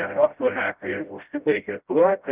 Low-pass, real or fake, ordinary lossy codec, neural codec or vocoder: 3.6 kHz; fake; Opus, 32 kbps; codec, 16 kHz, 0.5 kbps, FreqCodec, smaller model